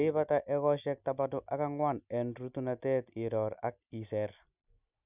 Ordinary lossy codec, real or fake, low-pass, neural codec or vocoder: none; real; 3.6 kHz; none